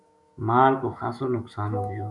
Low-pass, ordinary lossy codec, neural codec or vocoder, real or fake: 10.8 kHz; Opus, 64 kbps; autoencoder, 48 kHz, 128 numbers a frame, DAC-VAE, trained on Japanese speech; fake